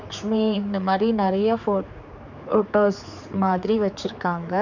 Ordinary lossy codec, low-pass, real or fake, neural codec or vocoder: none; 7.2 kHz; fake; codec, 16 kHz, 4 kbps, X-Codec, HuBERT features, trained on general audio